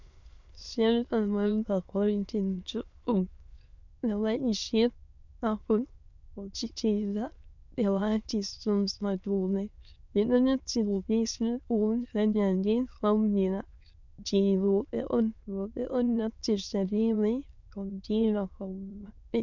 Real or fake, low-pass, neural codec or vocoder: fake; 7.2 kHz; autoencoder, 22.05 kHz, a latent of 192 numbers a frame, VITS, trained on many speakers